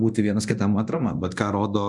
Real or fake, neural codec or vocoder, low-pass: fake; codec, 24 kHz, 0.9 kbps, DualCodec; 10.8 kHz